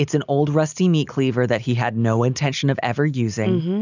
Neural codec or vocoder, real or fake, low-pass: none; real; 7.2 kHz